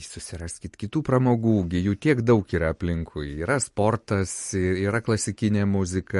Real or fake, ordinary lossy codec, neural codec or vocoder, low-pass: real; MP3, 48 kbps; none; 14.4 kHz